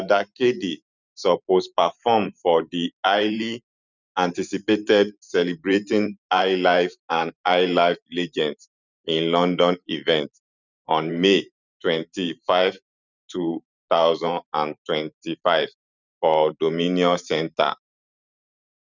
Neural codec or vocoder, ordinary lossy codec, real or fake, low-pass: vocoder, 44.1 kHz, 128 mel bands every 512 samples, BigVGAN v2; none; fake; 7.2 kHz